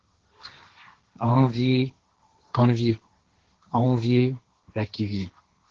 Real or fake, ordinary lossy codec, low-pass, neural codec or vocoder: fake; Opus, 16 kbps; 7.2 kHz; codec, 16 kHz, 1.1 kbps, Voila-Tokenizer